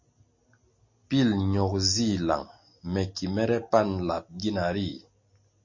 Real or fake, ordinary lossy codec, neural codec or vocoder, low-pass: real; MP3, 32 kbps; none; 7.2 kHz